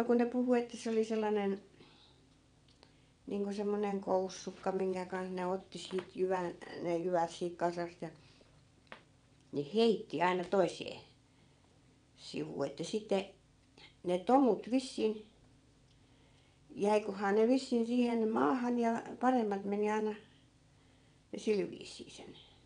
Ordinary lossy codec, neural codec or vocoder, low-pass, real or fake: none; vocoder, 22.05 kHz, 80 mel bands, WaveNeXt; 9.9 kHz; fake